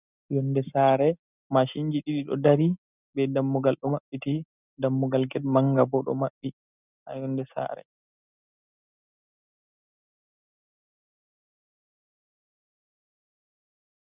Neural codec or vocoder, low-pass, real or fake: none; 3.6 kHz; real